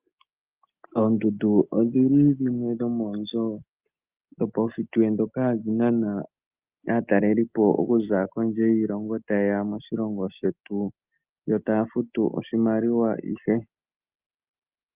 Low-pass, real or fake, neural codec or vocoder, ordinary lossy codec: 3.6 kHz; real; none; Opus, 32 kbps